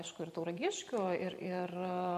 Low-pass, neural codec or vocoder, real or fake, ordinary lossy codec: 14.4 kHz; vocoder, 44.1 kHz, 128 mel bands every 512 samples, BigVGAN v2; fake; MP3, 64 kbps